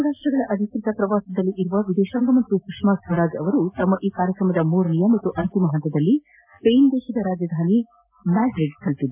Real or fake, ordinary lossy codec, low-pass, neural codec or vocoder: real; AAC, 32 kbps; 3.6 kHz; none